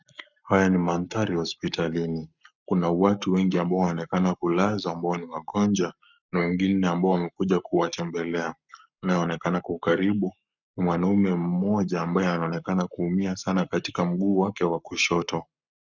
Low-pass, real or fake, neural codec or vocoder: 7.2 kHz; fake; codec, 44.1 kHz, 7.8 kbps, Pupu-Codec